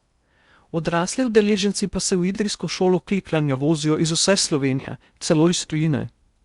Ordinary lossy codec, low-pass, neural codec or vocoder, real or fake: Opus, 64 kbps; 10.8 kHz; codec, 16 kHz in and 24 kHz out, 0.6 kbps, FocalCodec, streaming, 2048 codes; fake